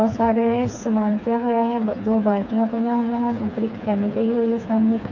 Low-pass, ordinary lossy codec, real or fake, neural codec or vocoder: 7.2 kHz; none; fake; codec, 16 kHz, 4 kbps, FreqCodec, smaller model